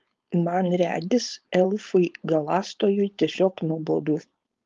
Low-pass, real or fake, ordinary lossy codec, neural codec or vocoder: 7.2 kHz; fake; Opus, 32 kbps; codec, 16 kHz, 4.8 kbps, FACodec